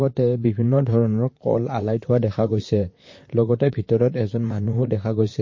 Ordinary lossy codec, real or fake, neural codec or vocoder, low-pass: MP3, 32 kbps; fake; vocoder, 44.1 kHz, 128 mel bands, Pupu-Vocoder; 7.2 kHz